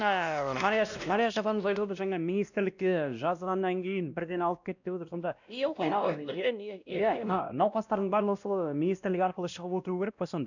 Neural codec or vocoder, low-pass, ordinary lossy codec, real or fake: codec, 16 kHz, 1 kbps, X-Codec, WavLM features, trained on Multilingual LibriSpeech; 7.2 kHz; none; fake